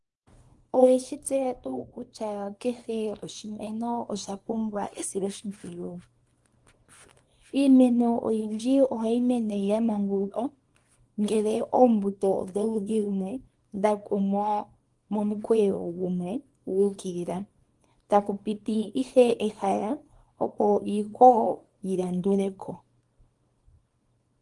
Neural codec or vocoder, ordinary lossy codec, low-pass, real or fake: codec, 24 kHz, 0.9 kbps, WavTokenizer, small release; Opus, 24 kbps; 10.8 kHz; fake